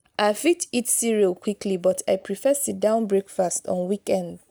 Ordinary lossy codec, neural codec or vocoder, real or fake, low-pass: none; none; real; none